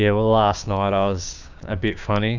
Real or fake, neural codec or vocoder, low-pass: fake; codec, 16 kHz, 6 kbps, DAC; 7.2 kHz